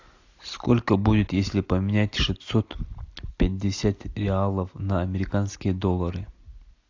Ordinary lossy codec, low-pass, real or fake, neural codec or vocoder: AAC, 48 kbps; 7.2 kHz; real; none